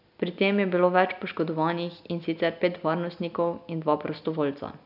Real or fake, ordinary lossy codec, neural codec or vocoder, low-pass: real; none; none; 5.4 kHz